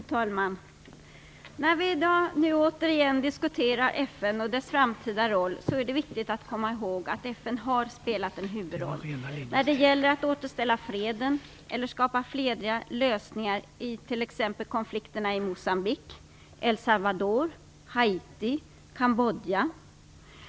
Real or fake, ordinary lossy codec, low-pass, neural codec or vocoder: real; none; none; none